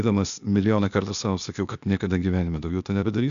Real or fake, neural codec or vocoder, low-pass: fake; codec, 16 kHz, 0.8 kbps, ZipCodec; 7.2 kHz